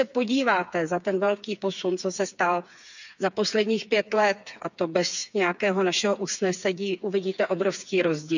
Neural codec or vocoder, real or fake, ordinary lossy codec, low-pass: codec, 16 kHz, 4 kbps, FreqCodec, smaller model; fake; none; 7.2 kHz